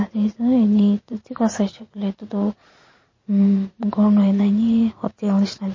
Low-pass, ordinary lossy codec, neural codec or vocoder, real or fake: 7.2 kHz; MP3, 32 kbps; vocoder, 44.1 kHz, 80 mel bands, Vocos; fake